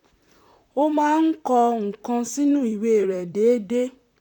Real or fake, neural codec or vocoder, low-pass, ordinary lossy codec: fake; vocoder, 44.1 kHz, 128 mel bands, Pupu-Vocoder; 19.8 kHz; none